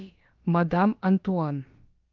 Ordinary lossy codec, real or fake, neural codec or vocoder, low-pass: Opus, 32 kbps; fake; codec, 16 kHz, about 1 kbps, DyCAST, with the encoder's durations; 7.2 kHz